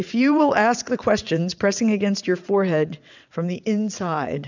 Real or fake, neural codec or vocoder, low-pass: fake; vocoder, 22.05 kHz, 80 mel bands, WaveNeXt; 7.2 kHz